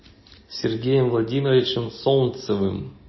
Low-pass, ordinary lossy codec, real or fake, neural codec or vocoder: 7.2 kHz; MP3, 24 kbps; real; none